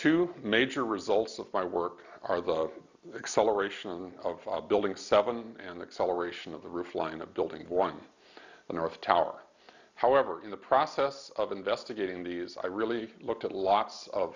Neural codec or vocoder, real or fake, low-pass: none; real; 7.2 kHz